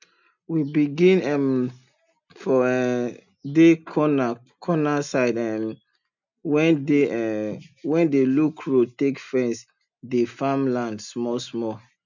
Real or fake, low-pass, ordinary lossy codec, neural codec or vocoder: real; 7.2 kHz; none; none